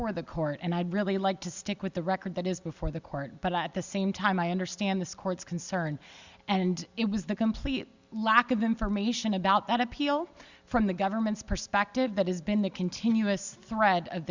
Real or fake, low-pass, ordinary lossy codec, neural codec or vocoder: real; 7.2 kHz; Opus, 64 kbps; none